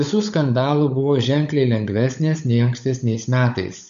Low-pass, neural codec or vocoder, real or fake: 7.2 kHz; codec, 16 kHz, 16 kbps, FunCodec, trained on LibriTTS, 50 frames a second; fake